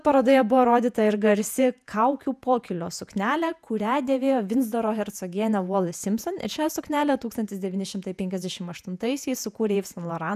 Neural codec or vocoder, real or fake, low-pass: vocoder, 48 kHz, 128 mel bands, Vocos; fake; 14.4 kHz